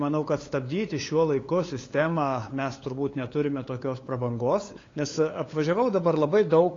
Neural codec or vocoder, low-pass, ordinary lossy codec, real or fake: codec, 16 kHz, 8 kbps, FunCodec, trained on LibriTTS, 25 frames a second; 7.2 kHz; AAC, 32 kbps; fake